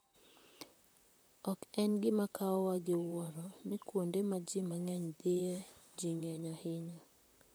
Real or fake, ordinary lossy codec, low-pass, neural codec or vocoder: fake; none; none; vocoder, 44.1 kHz, 128 mel bands, Pupu-Vocoder